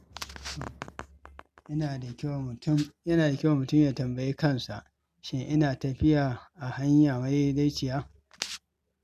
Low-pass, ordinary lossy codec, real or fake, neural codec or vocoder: 14.4 kHz; none; real; none